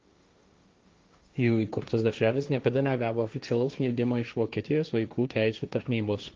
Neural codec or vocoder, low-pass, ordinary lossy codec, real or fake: codec, 16 kHz, 1.1 kbps, Voila-Tokenizer; 7.2 kHz; Opus, 32 kbps; fake